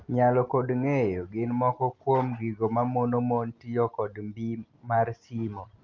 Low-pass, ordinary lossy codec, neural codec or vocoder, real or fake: 7.2 kHz; Opus, 24 kbps; none; real